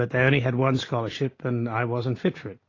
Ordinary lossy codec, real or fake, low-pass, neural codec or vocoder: AAC, 32 kbps; real; 7.2 kHz; none